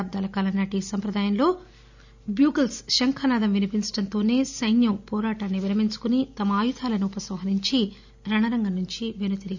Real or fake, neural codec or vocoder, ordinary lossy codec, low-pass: real; none; none; 7.2 kHz